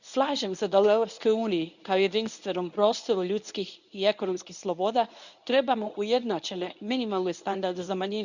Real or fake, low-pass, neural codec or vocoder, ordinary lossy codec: fake; 7.2 kHz; codec, 24 kHz, 0.9 kbps, WavTokenizer, medium speech release version 1; none